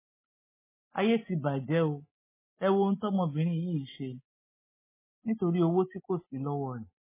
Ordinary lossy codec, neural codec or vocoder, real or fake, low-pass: MP3, 16 kbps; none; real; 3.6 kHz